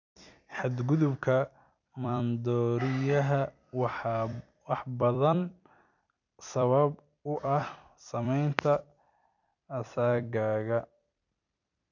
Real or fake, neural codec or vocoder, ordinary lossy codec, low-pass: fake; vocoder, 44.1 kHz, 128 mel bands every 256 samples, BigVGAN v2; none; 7.2 kHz